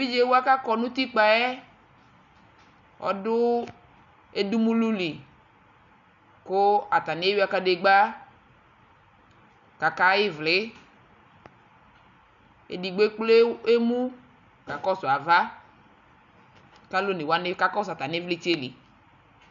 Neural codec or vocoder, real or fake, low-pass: none; real; 7.2 kHz